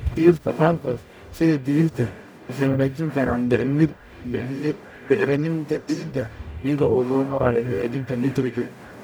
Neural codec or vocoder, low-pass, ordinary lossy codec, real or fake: codec, 44.1 kHz, 0.9 kbps, DAC; none; none; fake